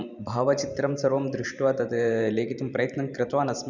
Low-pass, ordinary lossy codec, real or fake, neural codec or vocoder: 7.2 kHz; none; fake; vocoder, 44.1 kHz, 128 mel bands every 512 samples, BigVGAN v2